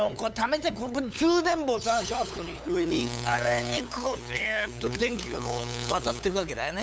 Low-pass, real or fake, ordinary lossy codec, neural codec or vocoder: none; fake; none; codec, 16 kHz, 8 kbps, FunCodec, trained on LibriTTS, 25 frames a second